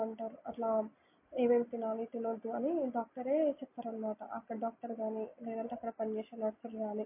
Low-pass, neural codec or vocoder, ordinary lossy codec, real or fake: 3.6 kHz; none; MP3, 24 kbps; real